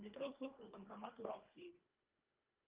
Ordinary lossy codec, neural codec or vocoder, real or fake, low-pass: Opus, 24 kbps; codec, 24 kHz, 1.5 kbps, HILCodec; fake; 3.6 kHz